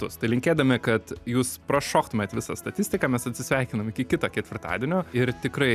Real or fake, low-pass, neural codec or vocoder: real; 14.4 kHz; none